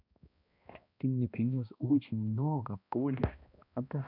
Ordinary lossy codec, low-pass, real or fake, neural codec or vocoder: none; 5.4 kHz; fake; codec, 16 kHz, 1 kbps, X-Codec, HuBERT features, trained on balanced general audio